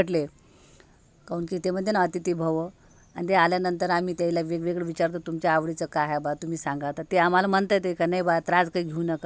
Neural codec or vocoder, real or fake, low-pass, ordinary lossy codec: none; real; none; none